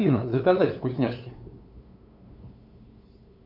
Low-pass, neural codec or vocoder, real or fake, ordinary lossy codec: 5.4 kHz; codec, 16 kHz, 2 kbps, FunCodec, trained on LibriTTS, 25 frames a second; fake; AAC, 48 kbps